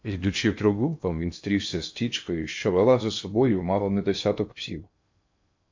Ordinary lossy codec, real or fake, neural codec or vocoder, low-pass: MP3, 48 kbps; fake; codec, 16 kHz in and 24 kHz out, 0.8 kbps, FocalCodec, streaming, 65536 codes; 7.2 kHz